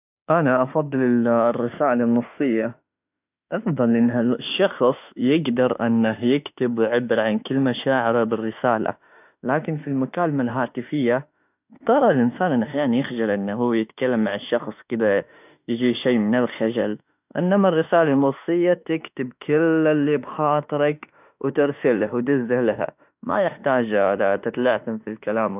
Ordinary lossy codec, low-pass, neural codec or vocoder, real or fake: none; 3.6 kHz; autoencoder, 48 kHz, 32 numbers a frame, DAC-VAE, trained on Japanese speech; fake